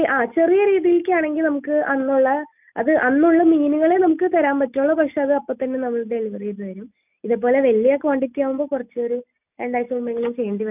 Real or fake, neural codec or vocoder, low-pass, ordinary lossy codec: real; none; 3.6 kHz; none